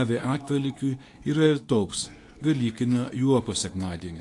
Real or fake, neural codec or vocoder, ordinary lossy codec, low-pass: fake; codec, 24 kHz, 0.9 kbps, WavTokenizer, medium speech release version 2; AAC, 48 kbps; 10.8 kHz